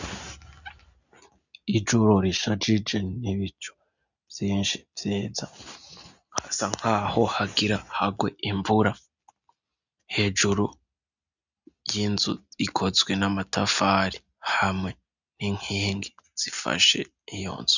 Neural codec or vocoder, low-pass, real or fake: none; 7.2 kHz; real